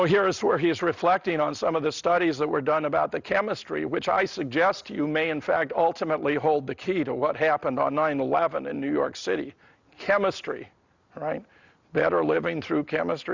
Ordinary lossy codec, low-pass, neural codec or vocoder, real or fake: Opus, 64 kbps; 7.2 kHz; none; real